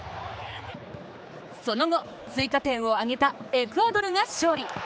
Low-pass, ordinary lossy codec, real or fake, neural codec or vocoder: none; none; fake; codec, 16 kHz, 4 kbps, X-Codec, HuBERT features, trained on general audio